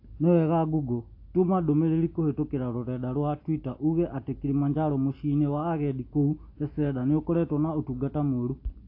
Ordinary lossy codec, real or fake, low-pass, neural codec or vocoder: AAC, 32 kbps; real; 5.4 kHz; none